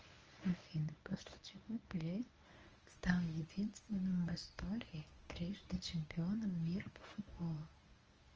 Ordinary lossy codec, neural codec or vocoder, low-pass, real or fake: Opus, 24 kbps; codec, 24 kHz, 0.9 kbps, WavTokenizer, medium speech release version 1; 7.2 kHz; fake